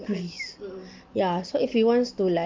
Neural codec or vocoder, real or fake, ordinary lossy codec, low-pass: none; real; Opus, 24 kbps; 7.2 kHz